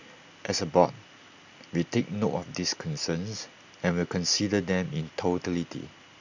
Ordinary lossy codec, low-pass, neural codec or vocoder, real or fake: none; 7.2 kHz; none; real